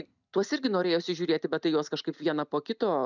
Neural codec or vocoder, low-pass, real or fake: none; 7.2 kHz; real